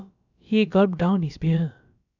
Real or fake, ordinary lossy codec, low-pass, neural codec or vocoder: fake; none; 7.2 kHz; codec, 16 kHz, about 1 kbps, DyCAST, with the encoder's durations